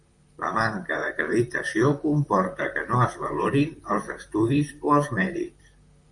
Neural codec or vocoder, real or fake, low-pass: vocoder, 44.1 kHz, 128 mel bands, Pupu-Vocoder; fake; 10.8 kHz